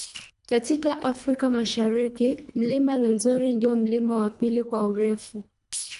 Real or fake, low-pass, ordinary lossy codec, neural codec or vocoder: fake; 10.8 kHz; AAC, 96 kbps; codec, 24 kHz, 1.5 kbps, HILCodec